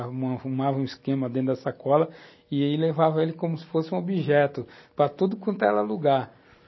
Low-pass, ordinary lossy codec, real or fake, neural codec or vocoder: 7.2 kHz; MP3, 24 kbps; real; none